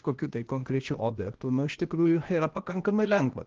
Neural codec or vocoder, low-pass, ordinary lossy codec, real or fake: codec, 16 kHz, 0.8 kbps, ZipCodec; 7.2 kHz; Opus, 16 kbps; fake